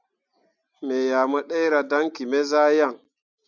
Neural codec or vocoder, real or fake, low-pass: none; real; 7.2 kHz